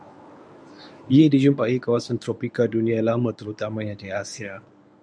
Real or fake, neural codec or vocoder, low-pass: fake; codec, 24 kHz, 0.9 kbps, WavTokenizer, medium speech release version 1; 9.9 kHz